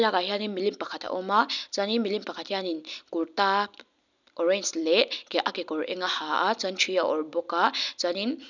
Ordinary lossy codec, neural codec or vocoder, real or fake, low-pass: none; none; real; 7.2 kHz